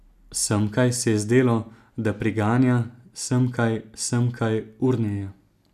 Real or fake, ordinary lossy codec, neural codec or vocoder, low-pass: real; none; none; 14.4 kHz